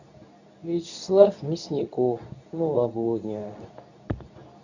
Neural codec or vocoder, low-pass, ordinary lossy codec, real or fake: codec, 24 kHz, 0.9 kbps, WavTokenizer, medium speech release version 2; 7.2 kHz; none; fake